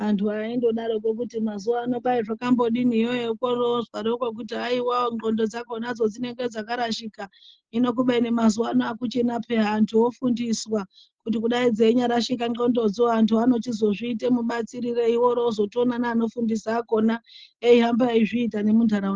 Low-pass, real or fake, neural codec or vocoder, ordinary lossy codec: 7.2 kHz; real; none; Opus, 16 kbps